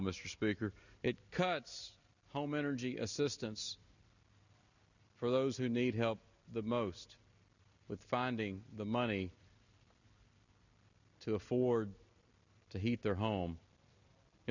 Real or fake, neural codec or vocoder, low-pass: real; none; 7.2 kHz